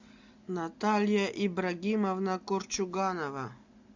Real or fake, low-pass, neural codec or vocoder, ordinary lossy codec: real; 7.2 kHz; none; MP3, 64 kbps